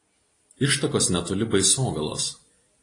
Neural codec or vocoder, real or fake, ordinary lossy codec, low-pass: none; real; AAC, 32 kbps; 10.8 kHz